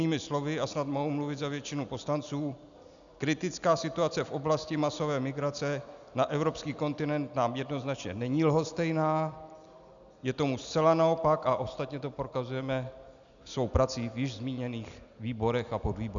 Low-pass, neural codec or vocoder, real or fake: 7.2 kHz; none; real